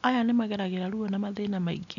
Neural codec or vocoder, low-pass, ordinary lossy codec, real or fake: none; 7.2 kHz; none; real